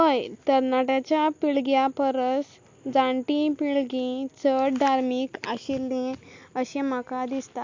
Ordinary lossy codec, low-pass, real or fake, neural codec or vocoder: MP3, 48 kbps; 7.2 kHz; real; none